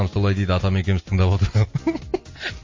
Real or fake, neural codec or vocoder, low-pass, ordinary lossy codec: real; none; 7.2 kHz; MP3, 32 kbps